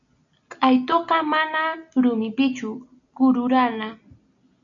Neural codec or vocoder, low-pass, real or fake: none; 7.2 kHz; real